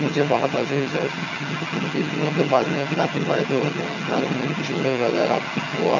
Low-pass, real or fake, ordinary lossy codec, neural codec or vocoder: 7.2 kHz; fake; none; vocoder, 22.05 kHz, 80 mel bands, HiFi-GAN